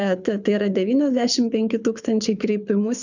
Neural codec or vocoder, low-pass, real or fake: codec, 24 kHz, 6 kbps, HILCodec; 7.2 kHz; fake